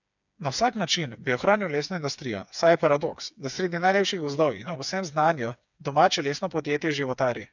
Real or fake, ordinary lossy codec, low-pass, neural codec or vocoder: fake; none; 7.2 kHz; codec, 16 kHz, 4 kbps, FreqCodec, smaller model